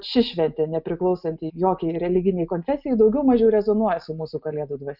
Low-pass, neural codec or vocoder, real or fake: 5.4 kHz; none; real